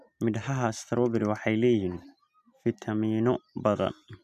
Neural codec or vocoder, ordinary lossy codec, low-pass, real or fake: none; none; 14.4 kHz; real